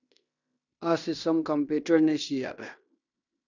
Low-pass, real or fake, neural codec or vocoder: 7.2 kHz; fake; codec, 16 kHz in and 24 kHz out, 0.9 kbps, LongCat-Audio-Codec, fine tuned four codebook decoder